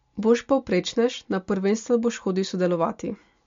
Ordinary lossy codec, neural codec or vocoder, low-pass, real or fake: MP3, 48 kbps; none; 7.2 kHz; real